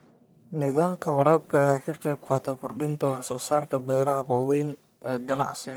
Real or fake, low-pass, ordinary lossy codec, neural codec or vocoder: fake; none; none; codec, 44.1 kHz, 1.7 kbps, Pupu-Codec